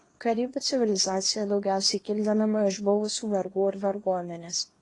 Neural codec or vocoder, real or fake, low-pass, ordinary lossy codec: codec, 24 kHz, 0.9 kbps, WavTokenizer, small release; fake; 10.8 kHz; AAC, 32 kbps